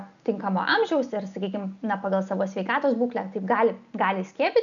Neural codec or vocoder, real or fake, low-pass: none; real; 7.2 kHz